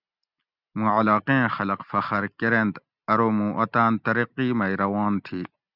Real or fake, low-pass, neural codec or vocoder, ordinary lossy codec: real; 5.4 kHz; none; Opus, 64 kbps